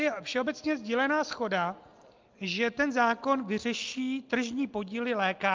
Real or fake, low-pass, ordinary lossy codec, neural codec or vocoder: real; 7.2 kHz; Opus, 24 kbps; none